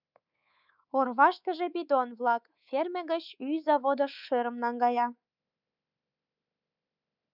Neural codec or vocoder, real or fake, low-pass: codec, 24 kHz, 3.1 kbps, DualCodec; fake; 5.4 kHz